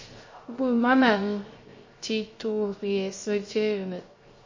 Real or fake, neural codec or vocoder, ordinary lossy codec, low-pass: fake; codec, 16 kHz, 0.3 kbps, FocalCodec; MP3, 32 kbps; 7.2 kHz